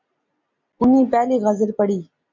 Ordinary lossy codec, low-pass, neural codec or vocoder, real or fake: MP3, 64 kbps; 7.2 kHz; none; real